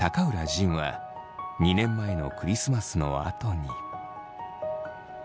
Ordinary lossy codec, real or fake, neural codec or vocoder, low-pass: none; real; none; none